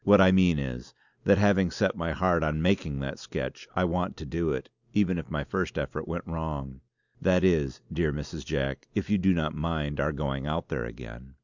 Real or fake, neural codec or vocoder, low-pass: real; none; 7.2 kHz